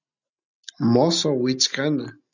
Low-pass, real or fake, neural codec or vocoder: 7.2 kHz; real; none